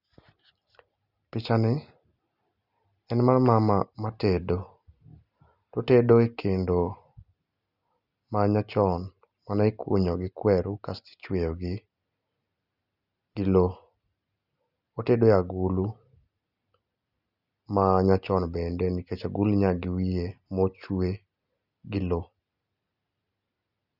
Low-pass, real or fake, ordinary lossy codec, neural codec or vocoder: 5.4 kHz; real; Opus, 64 kbps; none